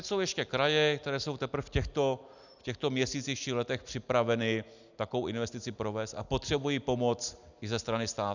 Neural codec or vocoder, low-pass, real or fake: none; 7.2 kHz; real